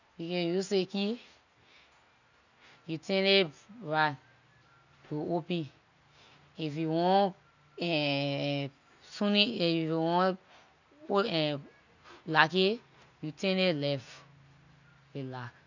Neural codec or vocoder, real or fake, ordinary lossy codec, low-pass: none; real; none; 7.2 kHz